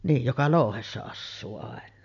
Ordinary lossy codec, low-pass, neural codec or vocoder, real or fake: none; 7.2 kHz; none; real